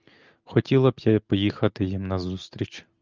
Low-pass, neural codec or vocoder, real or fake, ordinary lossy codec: 7.2 kHz; none; real; Opus, 32 kbps